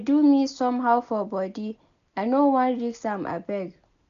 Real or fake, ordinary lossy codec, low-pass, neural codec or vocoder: real; none; 7.2 kHz; none